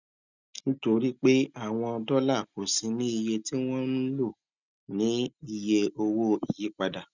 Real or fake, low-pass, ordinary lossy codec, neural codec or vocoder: real; 7.2 kHz; none; none